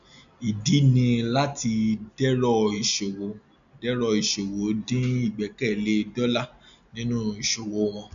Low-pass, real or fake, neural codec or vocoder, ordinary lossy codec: 7.2 kHz; real; none; none